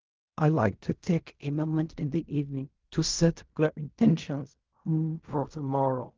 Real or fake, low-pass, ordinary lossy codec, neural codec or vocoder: fake; 7.2 kHz; Opus, 32 kbps; codec, 16 kHz in and 24 kHz out, 0.4 kbps, LongCat-Audio-Codec, fine tuned four codebook decoder